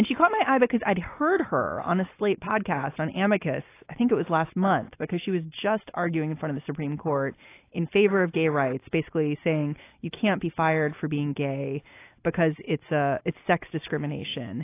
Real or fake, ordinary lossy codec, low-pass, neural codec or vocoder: real; AAC, 24 kbps; 3.6 kHz; none